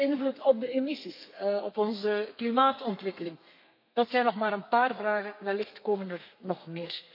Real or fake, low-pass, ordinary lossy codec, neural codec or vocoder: fake; 5.4 kHz; MP3, 32 kbps; codec, 32 kHz, 1.9 kbps, SNAC